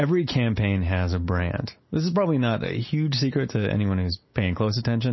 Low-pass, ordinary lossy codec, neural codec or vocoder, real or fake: 7.2 kHz; MP3, 24 kbps; none; real